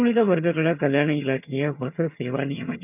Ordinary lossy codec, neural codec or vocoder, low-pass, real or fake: none; vocoder, 22.05 kHz, 80 mel bands, HiFi-GAN; 3.6 kHz; fake